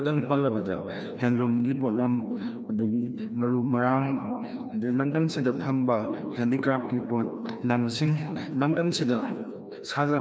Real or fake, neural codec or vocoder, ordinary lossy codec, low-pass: fake; codec, 16 kHz, 1 kbps, FreqCodec, larger model; none; none